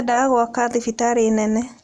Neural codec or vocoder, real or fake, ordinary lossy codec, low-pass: none; real; none; none